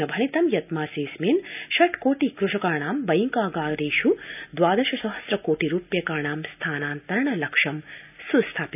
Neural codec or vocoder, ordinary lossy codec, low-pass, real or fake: none; none; 3.6 kHz; real